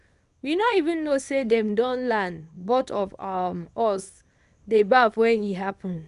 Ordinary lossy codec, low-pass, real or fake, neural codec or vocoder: none; 10.8 kHz; fake; codec, 24 kHz, 0.9 kbps, WavTokenizer, small release